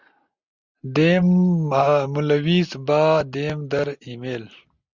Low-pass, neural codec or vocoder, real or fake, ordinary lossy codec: 7.2 kHz; none; real; Opus, 64 kbps